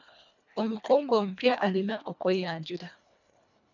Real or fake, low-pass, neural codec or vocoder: fake; 7.2 kHz; codec, 24 kHz, 1.5 kbps, HILCodec